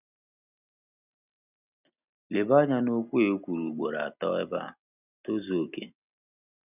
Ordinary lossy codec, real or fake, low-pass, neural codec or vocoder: none; real; 3.6 kHz; none